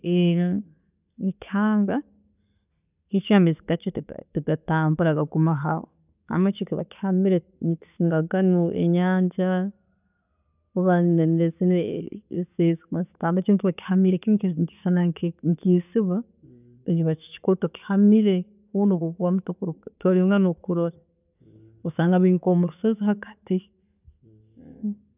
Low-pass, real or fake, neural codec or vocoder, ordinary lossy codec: 3.6 kHz; real; none; none